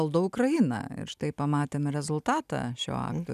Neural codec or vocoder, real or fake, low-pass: none; real; 14.4 kHz